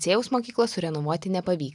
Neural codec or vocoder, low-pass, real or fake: none; 10.8 kHz; real